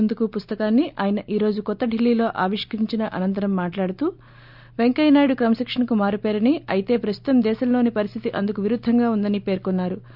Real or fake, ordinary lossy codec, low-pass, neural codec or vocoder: real; none; 5.4 kHz; none